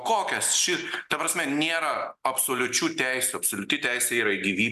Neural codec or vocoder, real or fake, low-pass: none; real; 14.4 kHz